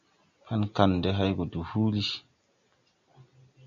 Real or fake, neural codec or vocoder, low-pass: real; none; 7.2 kHz